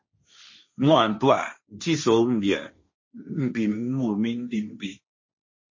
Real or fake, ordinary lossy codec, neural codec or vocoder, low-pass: fake; MP3, 32 kbps; codec, 16 kHz, 1.1 kbps, Voila-Tokenizer; 7.2 kHz